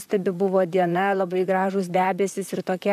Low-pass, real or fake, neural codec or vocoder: 14.4 kHz; fake; vocoder, 44.1 kHz, 128 mel bands, Pupu-Vocoder